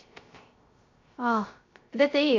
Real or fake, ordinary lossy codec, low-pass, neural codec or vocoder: fake; MP3, 48 kbps; 7.2 kHz; codec, 16 kHz, 0.3 kbps, FocalCodec